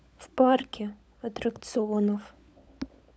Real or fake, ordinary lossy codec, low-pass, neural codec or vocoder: fake; none; none; codec, 16 kHz, 16 kbps, FunCodec, trained on LibriTTS, 50 frames a second